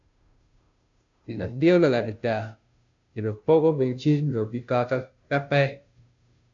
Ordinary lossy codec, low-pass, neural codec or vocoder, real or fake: AAC, 64 kbps; 7.2 kHz; codec, 16 kHz, 0.5 kbps, FunCodec, trained on Chinese and English, 25 frames a second; fake